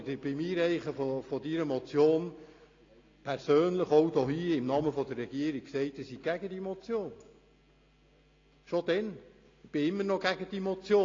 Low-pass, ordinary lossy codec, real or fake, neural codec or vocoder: 7.2 kHz; AAC, 32 kbps; real; none